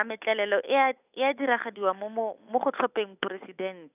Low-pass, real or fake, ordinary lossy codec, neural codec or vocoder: 3.6 kHz; fake; none; codec, 16 kHz, 8 kbps, FunCodec, trained on Chinese and English, 25 frames a second